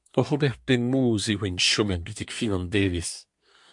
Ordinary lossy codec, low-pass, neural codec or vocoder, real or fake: MP3, 64 kbps; 10.8 kHz; codec, 24 kHz, 1 kbps, SNAC; fake